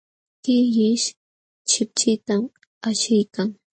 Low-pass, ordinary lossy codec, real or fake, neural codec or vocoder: 9.9 kHz; MP3, 32 kbps; real; none